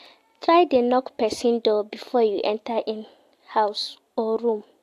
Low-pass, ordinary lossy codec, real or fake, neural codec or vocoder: 14.4 kHz; AAC, 64 kbps; real; none